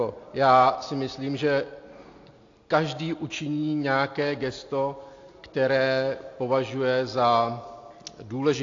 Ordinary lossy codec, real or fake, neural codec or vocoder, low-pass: AAC, 48 kbps; real; none; 7.2 kHz